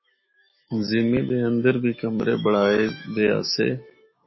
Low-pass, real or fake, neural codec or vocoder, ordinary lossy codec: 7.2 kHz; fake; autoencoder, 48 kHz, 128 numbers a frame, DAC-VAE, trained on Japanese speech; MP3, 24 kbps